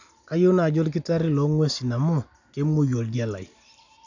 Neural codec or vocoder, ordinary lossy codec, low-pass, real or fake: none; none; 7.2 kHz; real